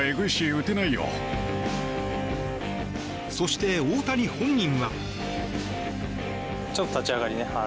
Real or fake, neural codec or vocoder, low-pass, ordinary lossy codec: real; none; none; none